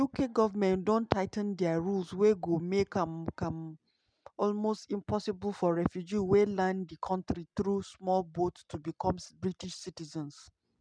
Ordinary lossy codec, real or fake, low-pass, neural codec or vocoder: none; real; 9.9 kHz; none